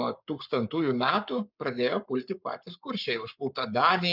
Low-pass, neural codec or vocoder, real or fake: 5.4 kHz; codec, 44.1 kHz, 7.8 kbps, Pupu-Codec; fake